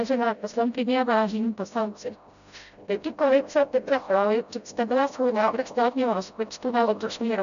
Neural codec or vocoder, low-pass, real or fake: codec, 16 kHz, 0.5 kbps, FreqCodec, smaller model; 7.2 kHz; fake